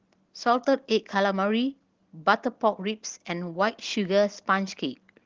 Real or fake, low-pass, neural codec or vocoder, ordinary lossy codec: real; 7.2 kHz; none; Opus, 16 kbps